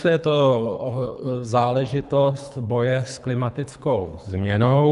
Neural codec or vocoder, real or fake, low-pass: codec, 24 kHz, 3 kbps, HILCodec; fake; 10.8 kHz